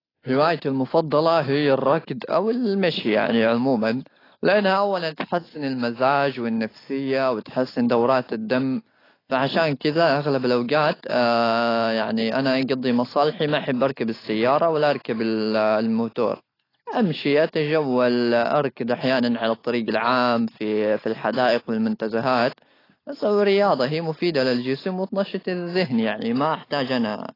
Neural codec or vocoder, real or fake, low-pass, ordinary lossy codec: none; real; 5.4 kHz; AAC, 24 kbps